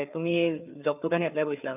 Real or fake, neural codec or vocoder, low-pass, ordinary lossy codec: fake; codec, 16 kHz, 4 kbps, FreqCodec, larger model; 3.6 kHz; none